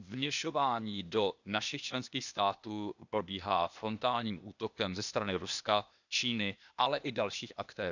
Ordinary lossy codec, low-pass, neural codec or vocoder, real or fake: none; 7.2 kHz; codec, 16 kHz, 0.8 kbps, ZipCodec; fake